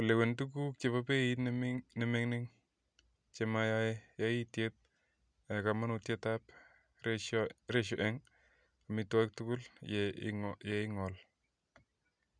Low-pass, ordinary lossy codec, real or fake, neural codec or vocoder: 9.9 kHz; none; real; none